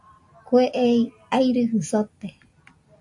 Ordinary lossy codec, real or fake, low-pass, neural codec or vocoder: AAC, 64 kbps; real; 10.8 kHz; none